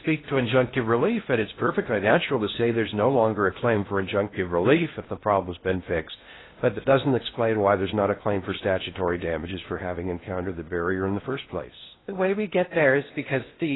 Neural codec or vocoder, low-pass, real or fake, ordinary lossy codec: codec, 16 kHz in and 24 kHz out, 0.6 kbps, FocalCodec, streaming, 2048 codes; 7.2 kHz; fake; AAC, 16 kbps